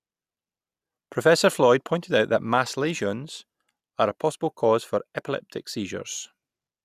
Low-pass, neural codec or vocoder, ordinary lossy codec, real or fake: 14.4 kHz; none; none; real